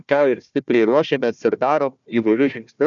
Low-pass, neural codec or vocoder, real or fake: 7.2 kHz; codec, 16 kHz, 1 kbps, FunCodec, trained on Chinese and English, 50 frames a second; fake